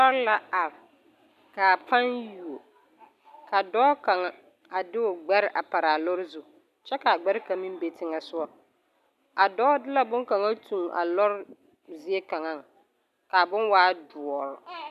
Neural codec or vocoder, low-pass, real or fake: none; 14.4 kHz; real